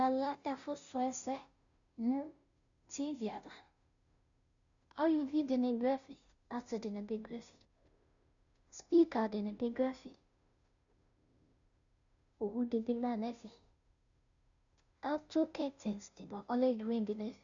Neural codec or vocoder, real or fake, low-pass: codec, 16 kHz, 0.5 kbps, FunCodec, trained on Chinese and English, 25 frames a second; fake; 7.2 kHz